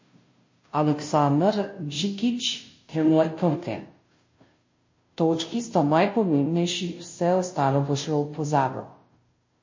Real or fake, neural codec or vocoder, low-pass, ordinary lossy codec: fake; codec, 16 kHz, 0.5 kbps, FunCodec, trained on Chinese and English, 25 frames a second; 7.2 kHz; MP3, 32 kbps